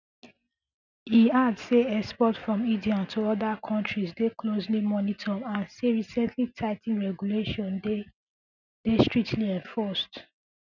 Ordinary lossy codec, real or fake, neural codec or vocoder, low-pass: none; real; none; 7.2 kHz